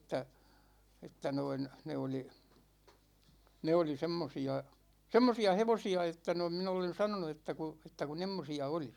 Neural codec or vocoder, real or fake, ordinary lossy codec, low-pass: none; real; none; 19.8 kHz